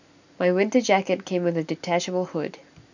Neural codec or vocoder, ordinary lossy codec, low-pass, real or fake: none; none; 7.2 kHz; real